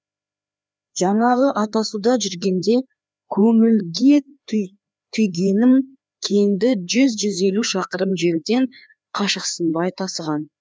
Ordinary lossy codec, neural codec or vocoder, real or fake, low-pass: none; codec, 16 kHz, 2 kbps, FreqCodec, larger model; fake; none